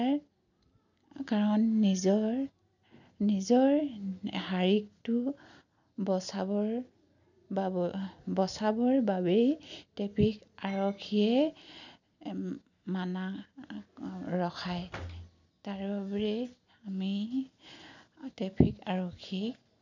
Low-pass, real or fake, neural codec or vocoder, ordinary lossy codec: 7.2 kHz; real; none; none